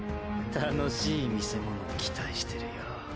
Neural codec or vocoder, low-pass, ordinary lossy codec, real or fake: none; none; none; real